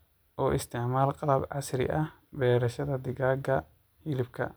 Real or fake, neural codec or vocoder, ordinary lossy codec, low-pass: real; none; none; none